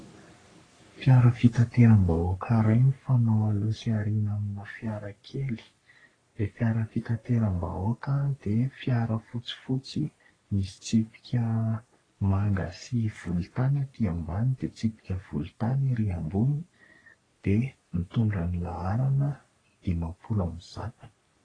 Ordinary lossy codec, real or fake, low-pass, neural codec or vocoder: AAC, 32 kbps; fake; 9.9 kHz; codec, 44.1 kHz, 3.4 kbps, Pupu-Codec